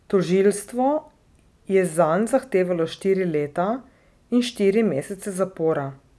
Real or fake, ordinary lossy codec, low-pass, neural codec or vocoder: real; none; none; none